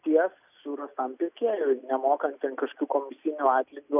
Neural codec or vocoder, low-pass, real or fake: none; 3.6 kHz; real